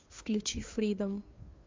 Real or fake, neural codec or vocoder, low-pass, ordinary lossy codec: fake; codec, 16 kHz, 2 kbps, FunCodec, trained on Chinese and English, 25 frames a second; 7.2 kHz; MP3, 48 kbps